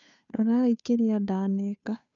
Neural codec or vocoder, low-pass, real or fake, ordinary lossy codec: codec, 16 kHz, 2 kbps, FunCodec, trained on Chinese and English, 25 frames a second; 7.2 kHz; fake; none